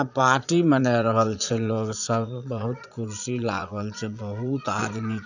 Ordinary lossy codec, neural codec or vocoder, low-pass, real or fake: none; vocoder, 22.05 kHz, 80 mel bands, Vocos; 7.2 kHz; fake